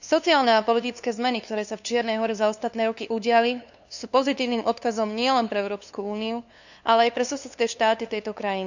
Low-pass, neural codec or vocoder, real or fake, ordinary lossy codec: 7.2 kHz; codec, 16 kHz, 2 kbps, FunCodec, trained on LibriTTS, 25 frames a second; fake; none